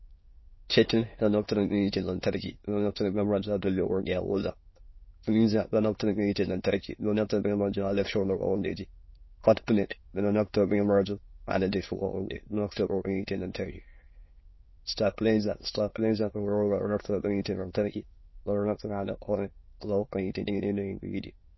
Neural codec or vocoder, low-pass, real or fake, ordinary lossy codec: autoencoder, 22.05 kHz, a latent of 192 numbers a frame, VITS, trained on many speakers; 7.2 kHz; fake; MP3, 24 kbps